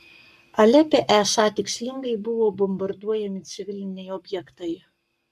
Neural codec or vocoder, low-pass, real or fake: codec, 44.1 kHz, 7.8 kbps, Pupu-Codec; 14.4 kHz; fake